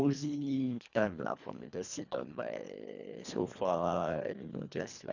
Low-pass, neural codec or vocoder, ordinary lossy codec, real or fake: 7.2 kHz; codec, 24 kHz, 1.5 kbps, HILCodec; none; fake